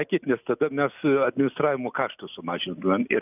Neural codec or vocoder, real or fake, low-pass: none; real; 3.6 kHz